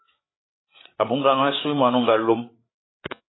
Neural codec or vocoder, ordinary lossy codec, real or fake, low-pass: codec, 16 kHz, 8 kbps, FreqCodec, larger model; AAC, 16 kbps; fake; 7.2 kHz